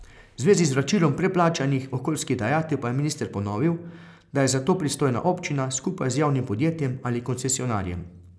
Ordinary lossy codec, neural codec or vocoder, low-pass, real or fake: none; none; none; real